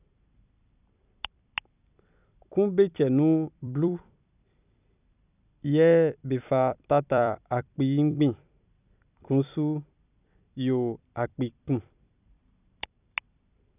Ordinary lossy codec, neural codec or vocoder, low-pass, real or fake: none; vocoder, 44.1 kHz, 128 mel bands every 256 samples, BigVGAN v2; 3.6 kHz; fake